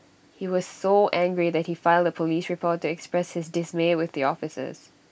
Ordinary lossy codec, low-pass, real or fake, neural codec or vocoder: none; none; real; none